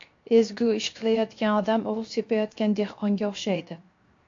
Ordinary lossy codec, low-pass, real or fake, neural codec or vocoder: AAC, 48 kbps; 7.2 kHz; fake; codec, 16 kHz, 0.7 kbps, FocalCodec